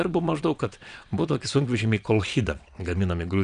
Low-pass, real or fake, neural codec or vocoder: 9.9 kHz; fake; vocoder, 22.05 kHz, 80 mel bands, WaveNeXt